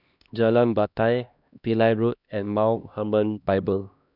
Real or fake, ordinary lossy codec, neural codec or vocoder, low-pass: fake; none; codec, 16 kHz, 1 kbps, X-Codec, HuBERT features, trained on LibriSpeech; 5.4 kHz